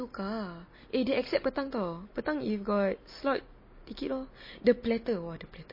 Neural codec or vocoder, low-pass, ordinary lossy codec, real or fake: none; 5.4 kHz; MP3, 24 kbps; real